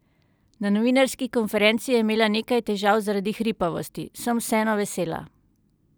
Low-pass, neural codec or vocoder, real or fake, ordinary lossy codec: none; none; real; none